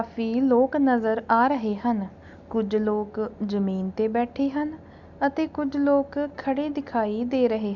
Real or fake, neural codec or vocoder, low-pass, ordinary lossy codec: real; none; 7.2 kHz; none